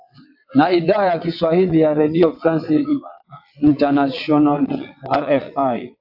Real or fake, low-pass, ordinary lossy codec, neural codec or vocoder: fake; 5.4 kHz; AAC, 48 kbps; vocoder, 22.05 kHz, 80 mel bands, WaveNeXt